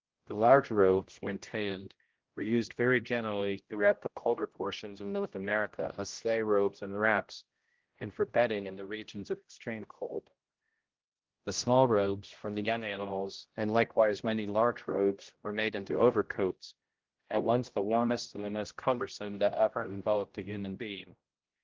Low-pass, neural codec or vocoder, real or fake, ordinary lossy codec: 7.2 kHz; codec, 16 kHz, 0.5 kbps, X-Codec, HuBERT features, trained on general audio; fake; Opus, 16 kbps